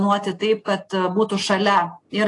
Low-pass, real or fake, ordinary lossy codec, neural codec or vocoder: 10.8 kHz; real; AAC, 48 kbps; none